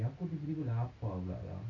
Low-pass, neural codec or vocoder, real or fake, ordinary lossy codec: 7.2 kHz; none; real; none